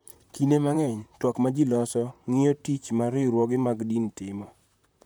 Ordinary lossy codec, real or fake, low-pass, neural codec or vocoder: none; fake; none; vocoder, 44.1 kHz, 128 mel bands, Pupu-Vocoder